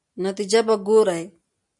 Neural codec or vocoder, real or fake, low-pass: none; real; 10.8 kHz